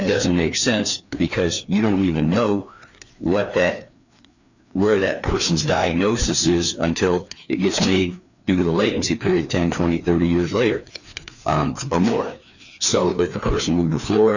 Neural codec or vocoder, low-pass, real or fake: codec, 16 kHz, 2 kbps, FreqCodec, larger model; 7.2 kHz; fake